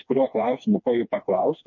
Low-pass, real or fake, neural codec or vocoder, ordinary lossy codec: 7.2 kHz; fake; codec, 16 kHz, 2 kbps, FreqCodec, smaller model; MP3, 48 kbps